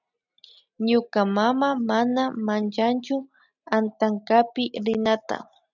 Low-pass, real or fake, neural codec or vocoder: 7.2 kHz; real; none